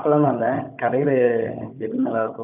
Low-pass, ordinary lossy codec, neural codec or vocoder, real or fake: 3.6 kHz; none; codec, 16 kHz, 16 kbps, FunCodec, trained on Chinese and English, 50 frames a second; fake